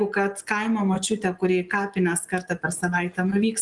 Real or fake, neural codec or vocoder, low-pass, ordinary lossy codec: real; none; 10.8 kHz; Opus, 24 kbps